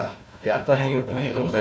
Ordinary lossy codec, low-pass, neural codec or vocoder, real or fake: none; none; codec, 16 kHz, 1 kbps, FunCodec, trained on Chinese and English, 50 frames a second; fake